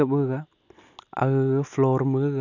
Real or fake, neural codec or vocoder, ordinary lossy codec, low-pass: real; none; none; 7.2 kHz